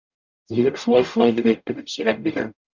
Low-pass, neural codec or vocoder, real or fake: 7.2 kHz; codec, 44.1 kHz, 0.9 kbps, DAC; fake